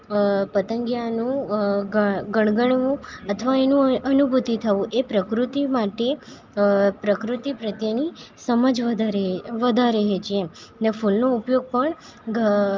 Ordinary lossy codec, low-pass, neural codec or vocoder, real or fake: Opus, 32 kbps; 7.2 kHz; none; real